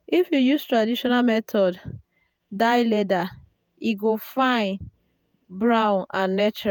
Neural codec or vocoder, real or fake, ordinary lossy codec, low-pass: vocoder, 48 kHz, 128 mel bands, Vocos; fake; none; 19.8 kHz